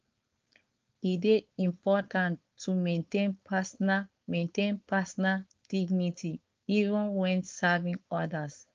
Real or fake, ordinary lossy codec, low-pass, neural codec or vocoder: fake; Opus, 24 kbps; 7.2 kHz; codec, 16 kHz, 4.8 kbps, FACodec